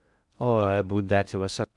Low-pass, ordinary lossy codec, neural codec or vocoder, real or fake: 10.8 kHz; none; codec, 16 kHz in and 24 kHz out, 0.6 kbps, FocalCodec, streaming, 4096 codes; fake